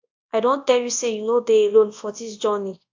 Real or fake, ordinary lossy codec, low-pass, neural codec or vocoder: fake; AAC, 48 kbps; 7.2 kHz; codec, 24 kHz, 0.9 kbps, WavTokenizer, large speech release